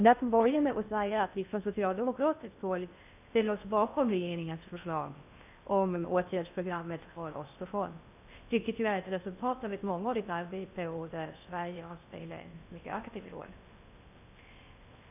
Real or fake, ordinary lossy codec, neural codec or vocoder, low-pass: fake; none; codec, 16 kHz in and 24 kHz out, 0.6 kbps, FocalCodec, streaming, 2048 codes; 3.6 kHz